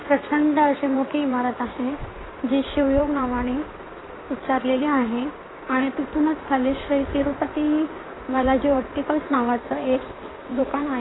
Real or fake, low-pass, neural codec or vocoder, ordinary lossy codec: fake; 7.2 kHz; codec, 16 kHz in and 24 kHz out, 2.2 kbps, FireRedTTS-2 codec; AAC, 16 kbps